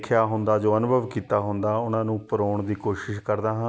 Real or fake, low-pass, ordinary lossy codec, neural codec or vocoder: real; none; none; none